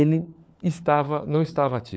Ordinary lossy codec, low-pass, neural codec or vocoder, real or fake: none; none; codec, 16 kHz, 4 kbps, FunCodec, trained on LibriTTS, 50 frames a second; fake